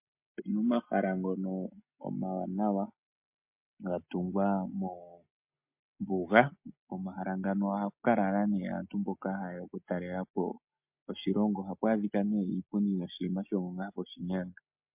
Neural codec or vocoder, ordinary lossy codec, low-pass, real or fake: none; MP3, 32 kbps; 3.6 kHz; real